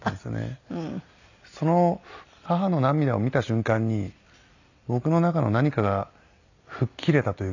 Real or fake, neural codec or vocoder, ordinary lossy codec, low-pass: real; none; none; 7.2 kHz